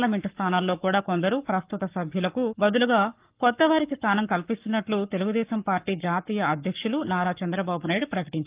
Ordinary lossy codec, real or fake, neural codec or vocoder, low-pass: Opus, 24 kbps; fake; codec, 44.1 kHz, 7.8 kbps, Pupu-Codec; 3.6 kHz